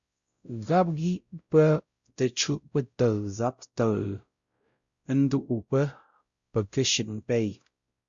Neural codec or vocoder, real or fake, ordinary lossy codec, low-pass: codec, 16 kHz, 0.5 kbps, X-Codec, WavLM features, trained on Multilingual LibriSpeech; fake; Opus, 64 kbps; 7.2 kHz